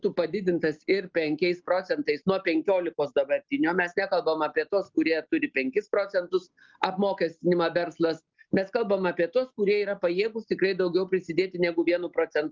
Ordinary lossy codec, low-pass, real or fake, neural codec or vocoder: Opus, 24 kbps; 7.2 kHz; real; none